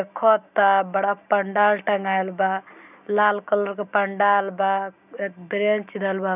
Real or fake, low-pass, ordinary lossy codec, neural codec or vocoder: real; 3.6 kHz; none; none